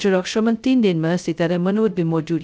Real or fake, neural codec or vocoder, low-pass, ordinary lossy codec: fake; codec, 16 kHz, 0.2 kbps, FocalCodec; none; none